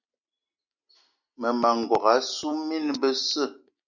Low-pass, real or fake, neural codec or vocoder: 7.2 kHz; real; none